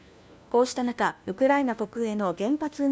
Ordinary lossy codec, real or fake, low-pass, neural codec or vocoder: none; fake; none; codec, 16 kHz, 1 kbps, FunCodec, trained on LibriTTS, 50 frames a second